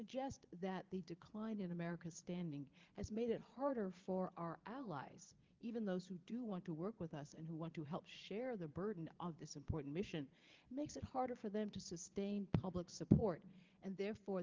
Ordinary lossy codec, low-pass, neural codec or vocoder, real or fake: Opus, 32 kbps; 7.2 kHz; vocoder, 22.05 kHz, 80 mel bands, Vocos; fake